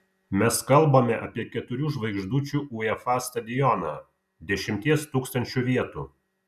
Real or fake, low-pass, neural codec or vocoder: real; 14.4 kHz; none